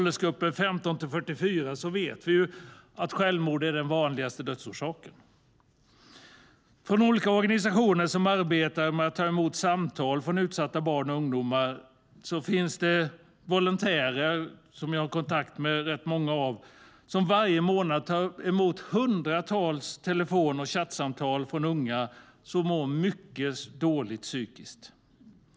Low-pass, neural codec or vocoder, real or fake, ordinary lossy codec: none; none; real; none